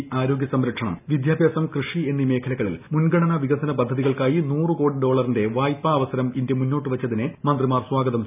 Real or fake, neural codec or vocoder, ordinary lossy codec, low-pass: real; none; none; 3.6 kHz